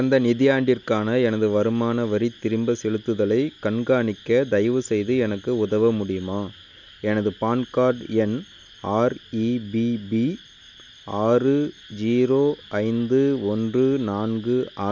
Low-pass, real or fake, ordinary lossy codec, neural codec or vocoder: 7.2 kHz; real; none; none